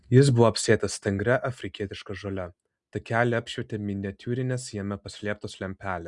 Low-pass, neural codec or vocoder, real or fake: 10.8 kHz; none; real